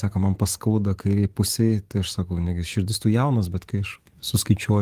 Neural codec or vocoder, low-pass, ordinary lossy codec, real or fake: codec, 44.1 kHz, 7.8 kbps, DAC; 14.4 kHz; Opus, 24 kbps; fake